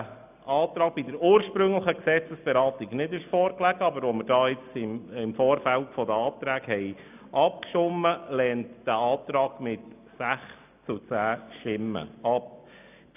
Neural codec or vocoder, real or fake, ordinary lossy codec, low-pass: none; real; none; 3.6 kHz